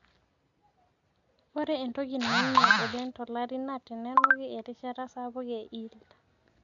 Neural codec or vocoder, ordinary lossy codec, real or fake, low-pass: none; none; real; 7.2 kHz